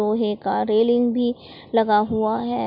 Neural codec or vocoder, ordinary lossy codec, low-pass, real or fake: none; none; 5.4 kHz; real